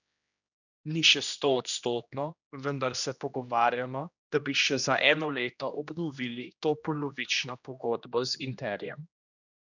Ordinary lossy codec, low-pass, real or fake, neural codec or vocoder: none; 7.2 kHz; fake; codec, 16 kHz, 1 kbps, X-Codec, HuBERT features, trained on general audio